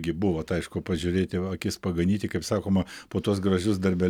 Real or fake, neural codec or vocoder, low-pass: real; none; 19.8 kHz